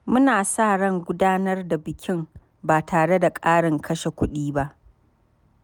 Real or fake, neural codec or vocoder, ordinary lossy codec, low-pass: real; none; none; none